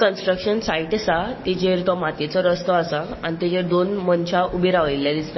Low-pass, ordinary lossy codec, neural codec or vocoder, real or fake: 7.2 kHz; MP3, 24 kbps; codec, 44.1 kHz, 7.8 kbps, DAC; fake